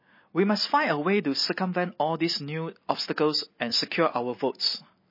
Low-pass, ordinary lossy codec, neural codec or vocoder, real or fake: 5.4 kHz; MP3, 24 kbps; none; real